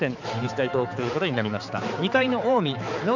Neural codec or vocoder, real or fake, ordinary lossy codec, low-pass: codec, 16 kHz, 4 kbps, X-Codec, HuBERT features, trained on balanced general audio; fake; none; 7.2 kHz